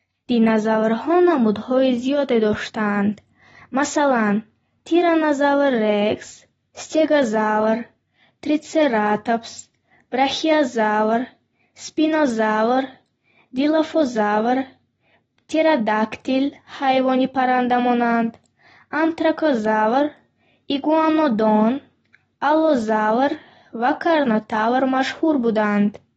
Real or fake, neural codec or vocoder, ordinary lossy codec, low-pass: real; none; AAC, 24 kbps; 19.8 kHz